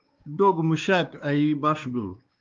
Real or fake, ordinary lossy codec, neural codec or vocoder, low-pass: fake; Opus, 32 kbps; codec, 16 kHz, 2 kbps, X-Codec, WavLM features, trained on Multilingual LibriSpeech; 7.2 kHz